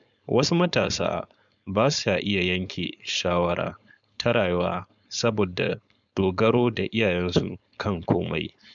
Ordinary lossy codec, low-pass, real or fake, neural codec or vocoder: MP3, 96 kbps; 7.2 kHz; fake; codec, 16 kHz, 4.8 kbps, FACodec